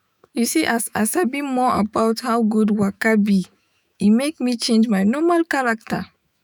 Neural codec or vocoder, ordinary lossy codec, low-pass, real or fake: autoencoder, 48 kHz, 128 numbers a frame, DAC-VAE, trained on Japanese speech; none; none; fake